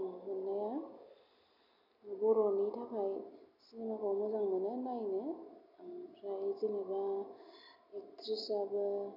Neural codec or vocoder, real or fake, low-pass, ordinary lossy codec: none; real; 5.4 kHz; MP3, 48 kbps